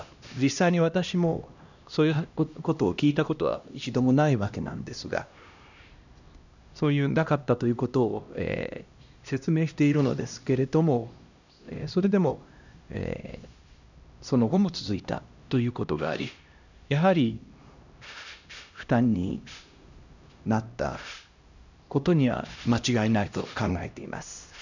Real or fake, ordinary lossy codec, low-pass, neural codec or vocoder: fake; none; 7.2 kHz; codec, 16 kHz, 1 kbps, X-Codec, HuBERT features, trained on LibriSpeech